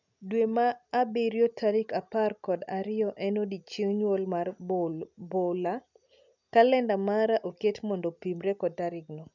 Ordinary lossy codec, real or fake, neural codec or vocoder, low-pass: none; real; none; 7.2 kHz